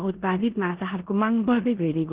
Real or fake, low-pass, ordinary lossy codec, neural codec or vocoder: fake; 3.6 kHz; Opus, 16 kbps; codec, 16 kHz in and 24 kHz out, 0.9 kbps, LongCat-Audio-Codec, four codebook decoder